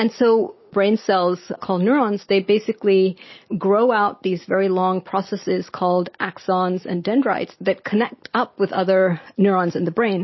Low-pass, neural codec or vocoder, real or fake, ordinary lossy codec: 7.2 kHz; none; real; MP3, 24 kbps